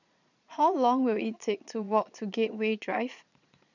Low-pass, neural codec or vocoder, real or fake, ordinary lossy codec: 7.2 kHz; vocoder, 22.05 kHz, 80 mel bands, Vocos; fake; none